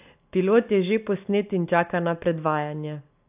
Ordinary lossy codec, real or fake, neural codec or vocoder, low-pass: AAC, 32 kbps; real; none; 3.6 kHz